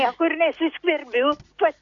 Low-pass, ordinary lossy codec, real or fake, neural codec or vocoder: 7.2 kHz; AAC, 48 kbps; real; none